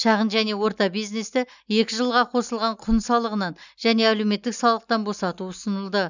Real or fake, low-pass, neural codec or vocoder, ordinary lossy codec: real; 7.2 kHz; none; none